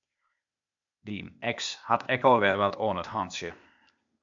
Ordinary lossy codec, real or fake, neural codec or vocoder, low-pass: MP3, 64 kbps; fake; codec, 16 kHz, 0.8 kbps, ZipCodec; 7.2 kHz